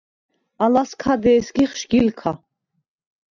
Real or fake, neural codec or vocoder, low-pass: real; none; 7.2 kHz